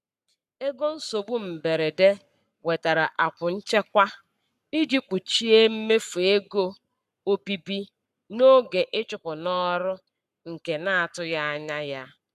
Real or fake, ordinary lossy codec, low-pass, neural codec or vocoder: fake; none; 14.4 kHz; codec, 44.1 kHz, 7.8 kbps, Pupu-Codec